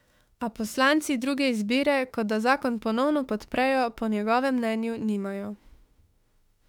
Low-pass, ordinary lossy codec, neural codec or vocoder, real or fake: 19.8 kHz; none; autoencoder, 48 kHz, 32 numbers a frame, DAC-VAE, trained on Japanese speech; fake